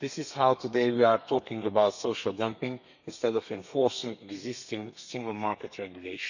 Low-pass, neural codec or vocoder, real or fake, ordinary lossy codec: 7.2 kHz; codec, 32 kHz, 1.9 kbps, SNAC; fake; none